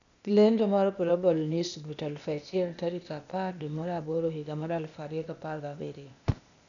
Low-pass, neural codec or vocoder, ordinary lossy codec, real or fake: 7.2 kHz; codec, 16 kHz, 0.8 kbps, ZipCodec; none; fake